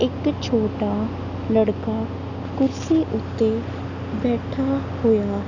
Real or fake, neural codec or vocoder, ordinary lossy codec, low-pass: real; none; none; 7.2 kHz